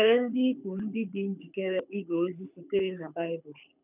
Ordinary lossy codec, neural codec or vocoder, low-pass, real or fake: none; codec, 24 kHz, 6 kbps, HILCodec; 3.6 kHz; fake